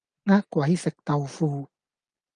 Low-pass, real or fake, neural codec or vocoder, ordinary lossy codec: 9.9 kHz; real; none; Opus, 32 kbps